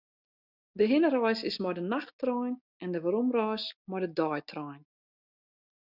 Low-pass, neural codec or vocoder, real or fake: 5.4 kHz; none; real